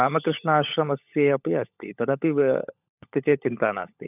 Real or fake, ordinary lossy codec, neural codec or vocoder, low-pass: fake; none; codec, 16 kHz, 16 kbps, FunCodec, trained on LibriTTS, 50 frames a second; 3.6 kHz